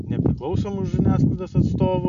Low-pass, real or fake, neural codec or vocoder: 7.2 kHz; real; none